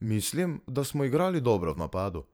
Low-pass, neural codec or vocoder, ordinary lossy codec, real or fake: none; vocoder, 44.1 kHz, 128 mel bands every 512 samples, BigVGAN v2; none; fake